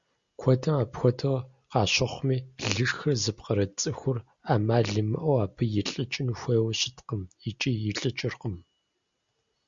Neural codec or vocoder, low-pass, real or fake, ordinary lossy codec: none; 7.2 kHz; real; Opus, 64 kbps